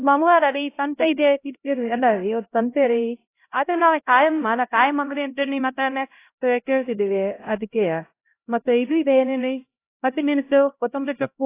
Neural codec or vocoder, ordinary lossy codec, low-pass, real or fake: codec, 16 kHz, 0.5 kbps, X-Codec, HuBERT features, trained on LibriSpeech; AAC, 24 kbps; 3.6 kHz; fake